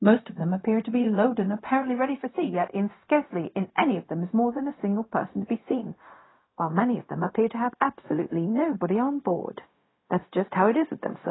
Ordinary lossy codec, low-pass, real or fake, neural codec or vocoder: AAC, 16 kbps; 7.2 kHz; fake; codec, 16 kHz, 0.4 kbps, LongCat-Audio-Codec